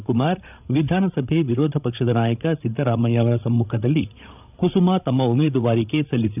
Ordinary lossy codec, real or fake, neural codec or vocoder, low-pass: none; fake; codec, 16 kHz, 16 kbps, FreqCodec, larger model; 3.6 kHz